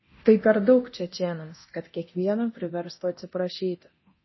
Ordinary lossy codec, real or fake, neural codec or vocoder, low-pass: MP3, 24 kbps; fake; codec, 24 kHz, 0.9 kbps, DualCodec; 7.2 kHz